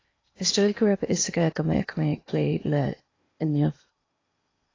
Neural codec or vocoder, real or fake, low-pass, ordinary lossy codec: codec, 16 kHz in and 24 kHz out, 0.8 kbps, FocalCodec, streaming, 65536 codes; fake; 7.2 kHz; AAC, 32 kbps